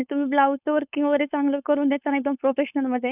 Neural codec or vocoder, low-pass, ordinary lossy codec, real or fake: codec, 16 kHz, 4.8 kbps, FACodec; 3.6 kHz; none; fake